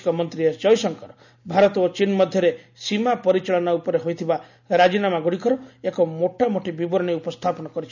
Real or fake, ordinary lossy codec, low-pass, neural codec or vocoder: real; none; 7.2 kHz; none